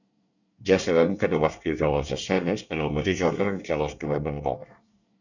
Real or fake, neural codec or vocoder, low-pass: fake; codec, 24 kHz, 1 kbps, SNAC; 7.2 kHz